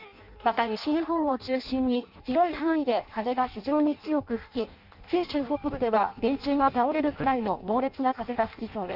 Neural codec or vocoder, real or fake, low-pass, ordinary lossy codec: codec, 16 kHz in and 24 kHz out, 0.6 kbps, FireRedTTS-2 codec; fake; 5.4 kHz; Opus, 64 kbps